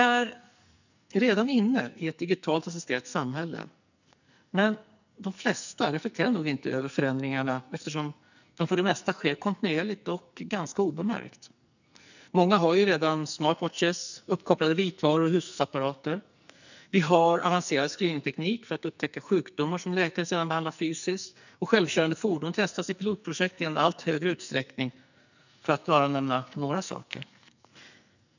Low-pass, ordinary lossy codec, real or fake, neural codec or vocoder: 7.2 kHz; none; fake; codec, 44.1 kHz, 2.6 kbps, SNAC